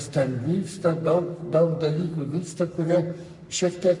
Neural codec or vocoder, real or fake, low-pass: codec, 44.1 kHz, 3.4 kbps, Pupu-Codec; fake; 10.8 kHz